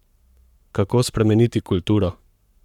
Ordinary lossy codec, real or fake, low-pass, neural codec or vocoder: none; fake; 19.8 kHz; codec, 44.1 kHz, 7.8 kbps, Pupu-Codec